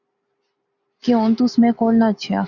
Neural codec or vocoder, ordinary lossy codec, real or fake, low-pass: none; Opus, 64 kbps; real; 7.2 kHz